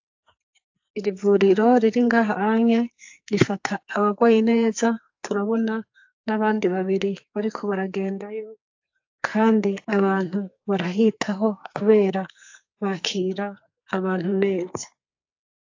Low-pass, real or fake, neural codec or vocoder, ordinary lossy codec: 7.2 kHz; fake; codec, 44.1 kHz, 2.6 kbps, SNAC; AAC, 48 kbps